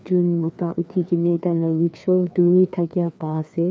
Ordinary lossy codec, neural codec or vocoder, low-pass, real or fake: none; codec, 16 kHz, 2 kbps, FreqCodec, larger model; none; fake